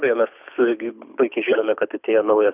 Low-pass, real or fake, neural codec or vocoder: 3.6 kHz; fake; codec, 24 kHz, 6 kbps, HILCodec